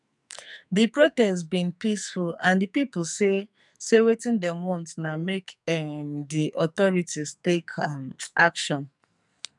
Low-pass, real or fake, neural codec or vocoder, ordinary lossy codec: 10.8 kHz; fake; codec, 44.1 kHz, 2.6 kbps, SNAC; none